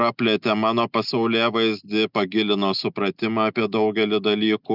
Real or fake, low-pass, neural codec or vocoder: real; 5.4 kHz; none